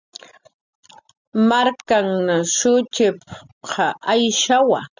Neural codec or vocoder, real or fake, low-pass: none; real; 7.2 kHz